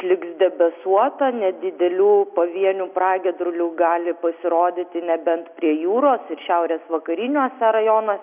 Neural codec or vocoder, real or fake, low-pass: none; real; 3.6 kHz